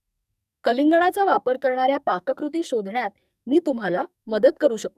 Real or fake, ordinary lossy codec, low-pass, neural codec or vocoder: fake; none; 14.4 kHz; codec, 44.1 kHz, 2.6 kbps, SNAC